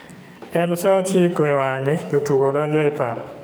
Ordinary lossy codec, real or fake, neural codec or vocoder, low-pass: none; fake; codec, 44.1 kHz, 2.6 kbps, SNAC; none